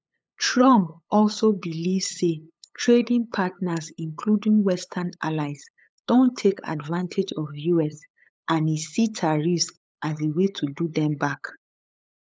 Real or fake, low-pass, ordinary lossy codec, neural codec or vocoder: fake; none; none; codec, 16 kHz, 8 kbps, FunCodec, trained on LibriTTS, 25 frames a second